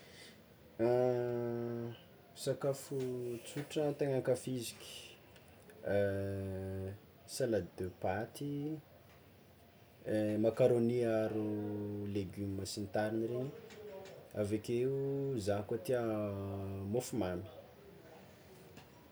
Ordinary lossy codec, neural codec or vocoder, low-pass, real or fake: none; none; none; real